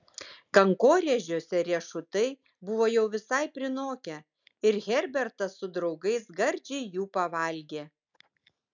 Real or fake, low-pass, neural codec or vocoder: real; 7.2 kHz; none